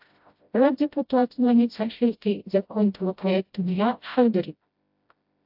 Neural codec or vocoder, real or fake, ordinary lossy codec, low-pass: codec, 16 kHz, 0.5 kbps, FreqCodec, smaller model; fake; AAC, 48 kbps; 5.4 kHz